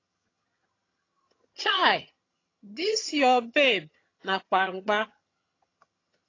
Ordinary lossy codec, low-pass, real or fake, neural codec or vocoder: AAC, 32 kbps; 7.2 kHz; fake; vocoder, 22.05 kHz, 80 mel bands, HiFi-GAN